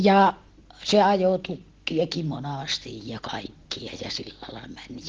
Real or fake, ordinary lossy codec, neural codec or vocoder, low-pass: real; Opus, 16 kbps; none; 7.2 kHz